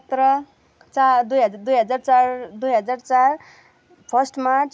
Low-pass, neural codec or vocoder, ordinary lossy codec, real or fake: none; none; none; real